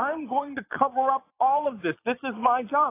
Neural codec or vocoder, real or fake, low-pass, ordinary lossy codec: vocoder, 22.05 kHz, 80 mel bands, Vocos; fake; 3.6 kHz; AAC, 24 kbps